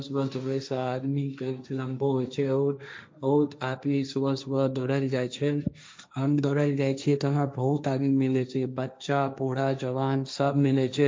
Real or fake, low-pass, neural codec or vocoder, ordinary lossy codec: fake; none; codec, 16 kHz, 1.1 kbps, Voila-Tokenizer; none